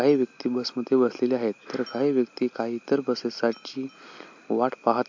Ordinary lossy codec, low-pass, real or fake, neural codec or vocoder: MP3, 48 kbps; 7.2 kHz; real; none